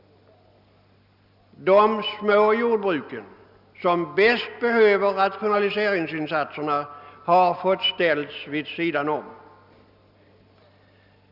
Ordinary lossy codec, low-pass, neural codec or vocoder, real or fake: none; 5.4 kHz; none; real